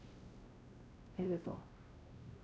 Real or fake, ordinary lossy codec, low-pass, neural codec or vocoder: fake; none; none; codec, 16 kHz, 1 kbps, X-Codec, WavLM features, trained on Multilingual LibriSpeech